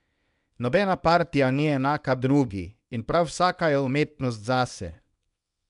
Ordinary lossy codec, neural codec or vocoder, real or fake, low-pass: none; codec, 24 kHz, 0.9 kbps, WavTokenizer, small release; fake; 10.8 kHz